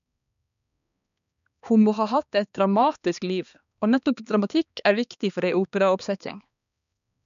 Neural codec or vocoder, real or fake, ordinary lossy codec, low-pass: codec, 16 kHz, 2 kbps, X-Codec, HuBERT features, trained on balanced general audio; fake; none; 7.2 kHz